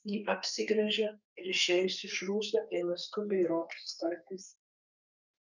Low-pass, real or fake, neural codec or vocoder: 7.2 kHz; fake; codec, 32 kHz, 1.9 kbps, SNAC